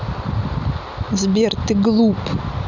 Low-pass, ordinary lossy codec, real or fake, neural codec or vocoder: 7.2 kHz; none; real; none